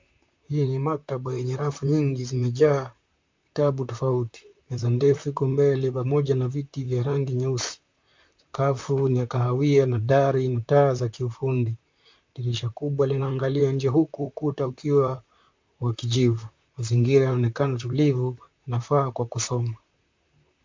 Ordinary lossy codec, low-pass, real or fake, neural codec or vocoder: MP3, 64 kbps; 7.2 kHz; fake; vocoder, 44.1 kHz, 128 mel bands, Pupu-Vocoder